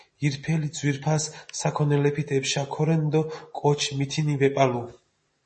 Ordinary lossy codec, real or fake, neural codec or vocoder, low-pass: MP3, 32 kbps; real; none; 10.8 kHz